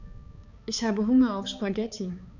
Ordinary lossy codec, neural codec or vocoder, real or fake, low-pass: none; codec, 16 kHz, 4 kbps, X-Codec, HuBERT features, trained on balanced general audio; fake; 7.2 kHz